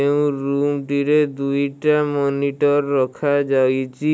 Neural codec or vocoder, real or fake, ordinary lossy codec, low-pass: none; real; none; none